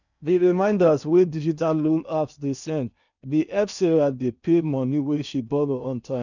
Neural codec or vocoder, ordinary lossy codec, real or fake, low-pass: codec, 16 kHz in and 24 kHz out, 0.8 kbps, FocalCodec, streaming, 65536 codes; none; fake; 7.2 kHz